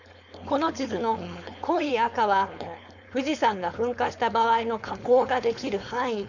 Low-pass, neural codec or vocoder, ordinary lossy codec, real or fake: 7.2 kHz; codec, 16 kHz, 4.8 kbps, FACodec; none; fake